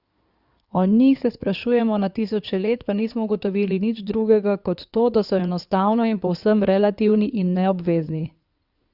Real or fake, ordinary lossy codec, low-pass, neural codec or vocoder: fake; Opus, 64 kbps; 5.4 kHz; codec, 16 kHz in and 24 kHz out, 2.2 kbps, FireRedTTS-2 codec